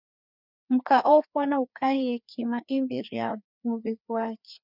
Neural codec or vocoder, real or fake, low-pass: codec, 16 kHz, 2 kbps, FreqCodec, larger model; fake; 5.4 kHz